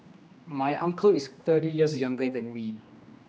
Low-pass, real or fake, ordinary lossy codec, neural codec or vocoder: none; fake; none; codec, 16 kHz, 1 kbps, X-Codec, HuBERT features, trained on general audio